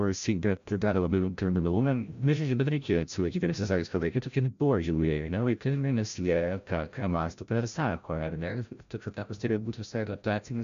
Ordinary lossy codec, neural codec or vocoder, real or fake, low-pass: MP3, 64 kbps; codec, 16 kHz, 0.5 kbps, FreqCodec, larger model; fake; 7.2 kHz